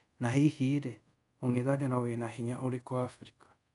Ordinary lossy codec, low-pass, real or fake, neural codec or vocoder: none; 10.8 kHz; fake; codec, 24 kHz, 0.5 kbps, DualCodec